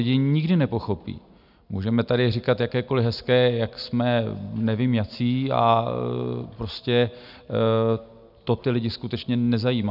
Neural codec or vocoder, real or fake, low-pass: none; real; 5.4 kHz